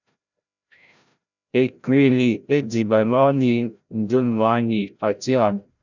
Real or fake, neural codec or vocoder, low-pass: fake; codec, 16 kHz, 0.5 kbps, FreqCodec, larger model; 7.2 kHz